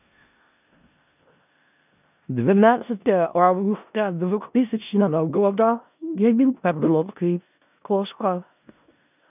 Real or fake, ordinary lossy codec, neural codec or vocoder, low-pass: fake; none; codec, 16 kHz in and 24 kHz out, 0.4 kbps, LongCat-Audio-Codec, four codebook decoder; 3.6 kHz